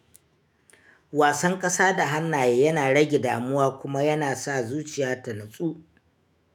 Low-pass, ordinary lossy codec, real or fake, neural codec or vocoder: none; none; fake; autoencoder, 48 kHz, 128 numbers a frame, DAC-VAE, trained on Japanese speech